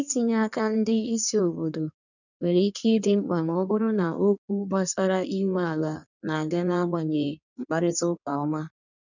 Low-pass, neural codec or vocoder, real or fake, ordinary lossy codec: 7.2 kHz; codec, 16 kHz in and 24 kHz out, 1.1 kbps, FireRedTTS-2 codec; fake; none